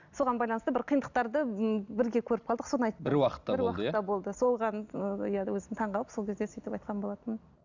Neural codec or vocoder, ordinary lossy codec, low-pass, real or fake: none; none; 7.2 kHz; real